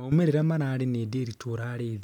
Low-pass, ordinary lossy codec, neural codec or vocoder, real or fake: 19.8 kHz; none; none; real